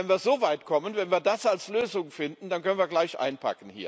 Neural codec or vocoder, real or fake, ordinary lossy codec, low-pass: none; real; none; none